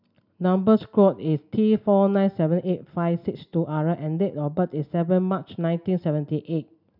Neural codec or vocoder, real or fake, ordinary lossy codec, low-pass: none; real; none; 5.4 kHz